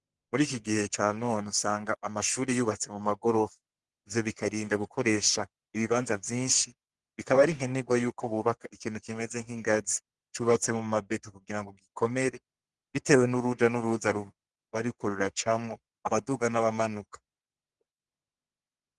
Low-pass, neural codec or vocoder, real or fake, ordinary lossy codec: 10.8 kHz; codec, 44.1 kHz, 3.4 kbps, Pupu-Codec; fake; Opus, 24 kbps